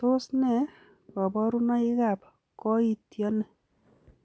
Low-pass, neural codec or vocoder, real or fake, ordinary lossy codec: none; none; real; none